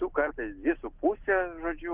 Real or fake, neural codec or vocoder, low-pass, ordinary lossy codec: real; none; 3.6 kHz; Opus, 16 kbps